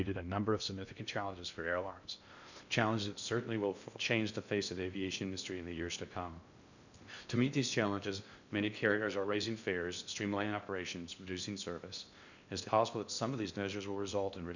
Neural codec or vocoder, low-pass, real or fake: codec, 16 kHz in and 24 kHz out, 0.6 kbps, FocalCodec, streaming, 2048 codes; 7.2 kHz; fake